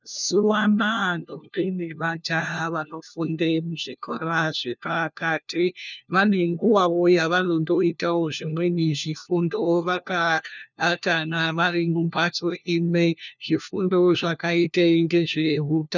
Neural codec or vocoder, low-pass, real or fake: codec, 16 kHz, 1 kbps, FunCodec, trained on LibriTTS, 50 frames a second; 7.2 kHz; fake